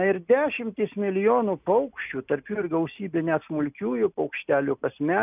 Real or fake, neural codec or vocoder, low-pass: real; none; 3.6 kHz